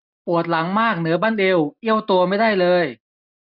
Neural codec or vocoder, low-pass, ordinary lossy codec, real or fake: none; 5.4 kHz; none; real